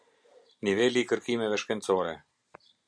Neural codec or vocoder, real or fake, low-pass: none; real; 9.9 kHz